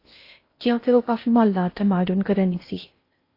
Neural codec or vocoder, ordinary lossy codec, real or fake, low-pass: codec, 16 kHz in and 24 kHz out, 0.6 kbps, FocalCodec, streaming, 4096 codes; AAC, 32 kbps; fake; 5.4 kHz